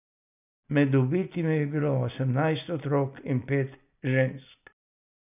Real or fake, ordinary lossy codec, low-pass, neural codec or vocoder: real; none; 3.6 kHz; none